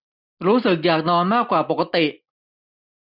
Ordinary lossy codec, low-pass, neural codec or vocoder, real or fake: none; 5.4 kHz; none; real